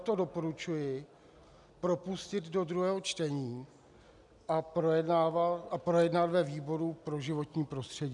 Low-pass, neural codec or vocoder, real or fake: 10.8 kHz; none; real